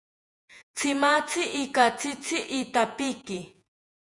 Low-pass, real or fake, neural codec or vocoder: 10.8 kHz; fake; vocoder, 48 kHz, 128 mel bands, Vocos